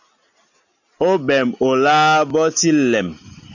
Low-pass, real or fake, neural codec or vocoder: 7.2 kHz; real; none